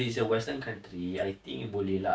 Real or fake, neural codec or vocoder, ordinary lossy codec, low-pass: fake; codec, 16 kHz, 6 kbps, DAC; none; none